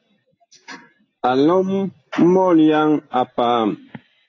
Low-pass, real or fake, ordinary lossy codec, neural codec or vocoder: 7.2 kHz; real; AAC, 32 kbps; none